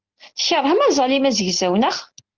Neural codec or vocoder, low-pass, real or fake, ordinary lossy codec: none; 7.2 kHz; real; Opus, 16 kbps